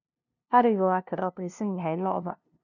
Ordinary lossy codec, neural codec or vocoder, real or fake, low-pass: none; codec, 16 kHz, 0.5 kbps, FunCodec, trained on LibriTTS, 25 frames a second; fake; 7.2 kHz